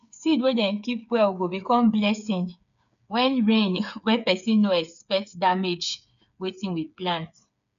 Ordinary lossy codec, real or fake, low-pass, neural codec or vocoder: none; fake; 7.2 kHz; codec, 16 kHz, 8 kbps, FreqCodec, smaller model